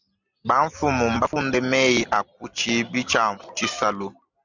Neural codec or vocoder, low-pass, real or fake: none; 7.2 kHz; real